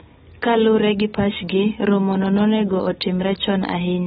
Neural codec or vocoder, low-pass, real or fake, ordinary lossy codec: none; 19.8 kHz; real; AAC, 16 kbps